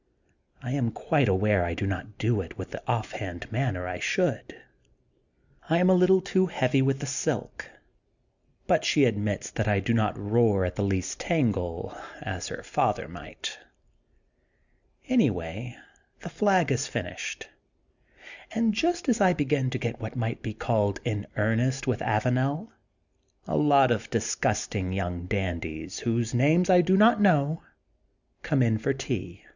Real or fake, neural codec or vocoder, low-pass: real; none; 7.2 kHz